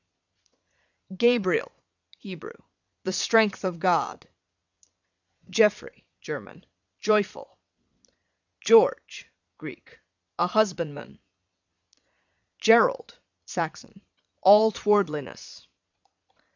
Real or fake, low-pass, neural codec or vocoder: fake; 7.2 kHz; vocoder, 22.05 kHz, 80 mel bands, Vocos